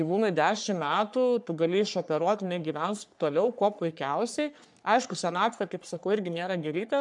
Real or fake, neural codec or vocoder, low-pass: fake; codec, 44.1 kHz, 3.4 kbps, Pupu-Codec; 10.8 kHz